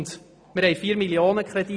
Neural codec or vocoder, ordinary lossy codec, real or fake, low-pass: none; none; real; none